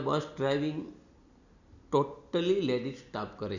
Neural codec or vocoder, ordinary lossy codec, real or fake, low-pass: none; none; real; 7.2 kHz